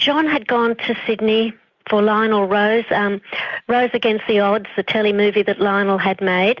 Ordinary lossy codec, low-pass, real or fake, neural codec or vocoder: Opus, 64 kbps; 7.2 kHz; real; none